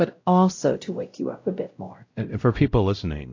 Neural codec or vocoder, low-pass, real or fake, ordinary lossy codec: codec, 16 kHz, 0.5 kbps, X-Codec, WavLM features, trained on Multilingual LibriSpeech; 7.2 kHz; fake; AAC, 48 kbps